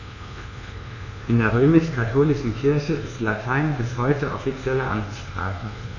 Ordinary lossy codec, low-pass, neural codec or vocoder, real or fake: none; 7.2 kHz; codec, 24 kHz, 1.2 kbps, DualCodec; fake